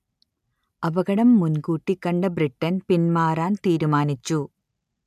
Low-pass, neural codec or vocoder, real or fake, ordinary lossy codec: 14.4 kHz; none; real; none